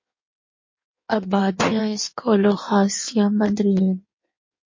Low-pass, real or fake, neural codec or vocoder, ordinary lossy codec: 7.2 kHz; fake; codec, 16 kHz in and 24 kHz out, 1.1 kbps, FireRedTTS-2 codec; MP3, 32 kbps